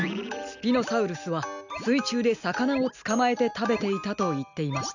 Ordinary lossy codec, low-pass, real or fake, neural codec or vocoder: none; 7.2 kHz; real; none